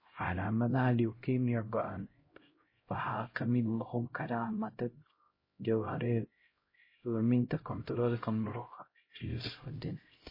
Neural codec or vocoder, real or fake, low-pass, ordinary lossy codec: codec, 16 kHz, 0.5 kbps, X-Codec, HuBERT features, trained on LibriSpeech; fake; 5.4 kHz; MP3, 24 kbps